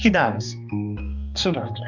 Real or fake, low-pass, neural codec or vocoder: fake; 7.2 kHz; codec, 16 kHz, 2 kbps, X-Codec, HuBERT features, trained on balanced general audio